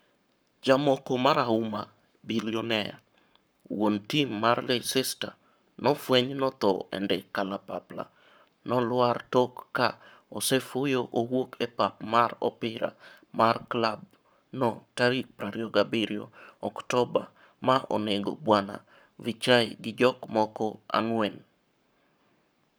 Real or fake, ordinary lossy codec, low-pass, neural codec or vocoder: fake; none; none; codec, 44.1 kHz, 7.8 kbps, Pupu-Codec